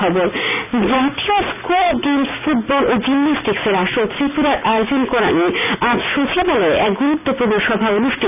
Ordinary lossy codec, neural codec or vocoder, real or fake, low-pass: none; none; real; 3.6 kHz